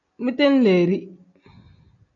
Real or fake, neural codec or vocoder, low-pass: real; none; 7.2 kHz